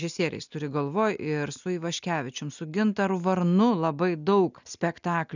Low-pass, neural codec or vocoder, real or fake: 7.2 kHz; none; real